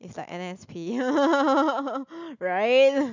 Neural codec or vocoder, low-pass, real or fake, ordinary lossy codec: none; 7.2 kHz; real; none